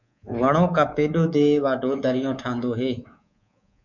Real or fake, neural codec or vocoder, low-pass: fake; codec, 24 kHz, 3.1 kbps, DualCodec; 7.2 kHz